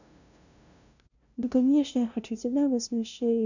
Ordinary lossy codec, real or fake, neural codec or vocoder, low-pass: none; fake; codec, 16 kHz, 0.5 kbps, FunCodec, trained on LibriTTS, 25 frames a second; 7.2 kHz